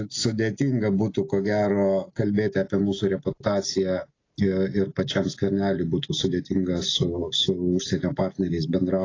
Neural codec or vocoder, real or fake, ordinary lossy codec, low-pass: none; real; AAC, 32 kbps; 7.2 kHz